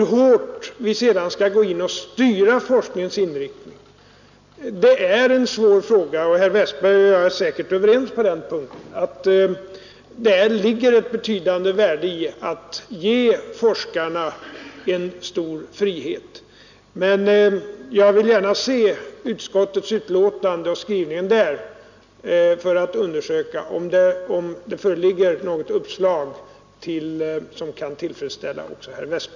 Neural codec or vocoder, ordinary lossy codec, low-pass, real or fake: none; none; 7.2 kHz; real